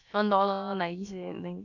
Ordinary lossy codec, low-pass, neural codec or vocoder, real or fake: none; 7.2 kHz; codec, 16 kHz, about 1 kbps, DyCAST, with the encoder's durations; fake